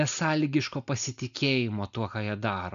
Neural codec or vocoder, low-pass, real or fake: none; 7.2 kHz; real